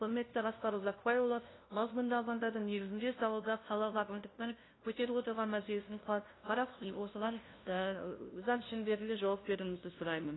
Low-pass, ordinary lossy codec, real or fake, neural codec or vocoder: 7.2 kHz; AAC, 16 kbps; fake; codec, 16 kHz, 0.5 kbps, FunCodec, trained on LibriTTS, 25 frames a second